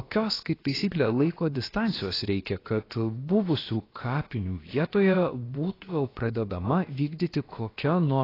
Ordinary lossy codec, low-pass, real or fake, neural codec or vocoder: AAC, 24 kbps; 5.4 kHz; fake; codec, 16 kHz, about 1 kbps, DyCAST, with the encoder's durations